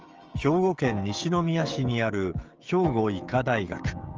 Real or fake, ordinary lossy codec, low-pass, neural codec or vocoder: fake; Opus, 24 kbps; 7.2 kHz; codec, 16 kHz, 16 kbps, FreqCodec, smaller model